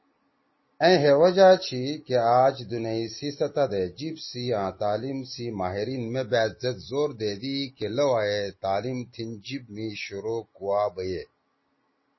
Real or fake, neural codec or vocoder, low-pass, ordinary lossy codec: real; none; 7.2 kHz; MP3, 24 kbps